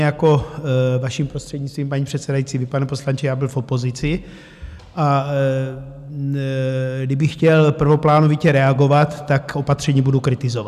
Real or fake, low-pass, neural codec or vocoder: real; 14.4 kHz; none